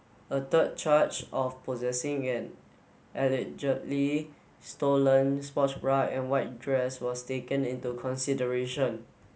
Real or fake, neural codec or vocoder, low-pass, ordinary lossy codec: real; none; none; none